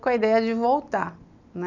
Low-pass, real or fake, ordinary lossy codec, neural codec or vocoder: 7.2 kHz; real; none; none